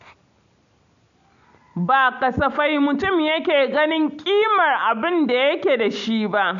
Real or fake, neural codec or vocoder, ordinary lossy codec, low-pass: real; none; MP3, 96 kbps; 7.2 kHz